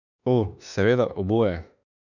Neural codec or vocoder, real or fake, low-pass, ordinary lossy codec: autoencoder, 48 kHz, 32 numbers a frame, DAC-VAE, trained on Japanese speech; fake; 7.2 kHz; none